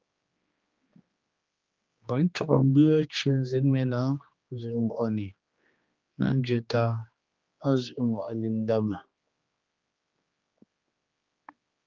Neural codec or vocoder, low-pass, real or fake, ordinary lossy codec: codec, 16 kHz, 2 kbps, X-Codec, HuBERT features, trained on general audio; 7.2 kHz; fake; Opus, 32 kbps